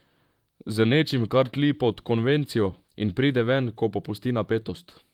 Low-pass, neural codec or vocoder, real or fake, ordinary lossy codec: 19.8 kHz; vocoder, 44.1 kHz, 128 mel bands, Pupu-Vocoder; fake; Opus, 32 kbps